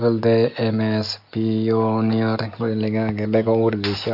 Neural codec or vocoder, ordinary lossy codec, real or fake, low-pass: none; AAC, 48 kbps; real; 5.4 kHz